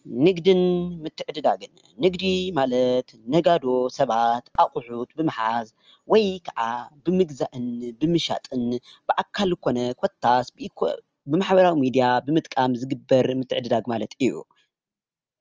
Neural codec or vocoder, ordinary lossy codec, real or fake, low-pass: none; Opus, 24 kbps; real; 7.2 kHz